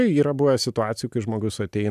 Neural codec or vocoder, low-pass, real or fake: none; 14.4 kHz; real